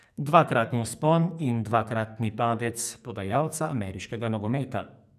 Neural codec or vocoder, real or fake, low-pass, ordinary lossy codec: codec, 44.1 kHz, 2.6 kbps, SNAC; fake; 14.4 kHz; none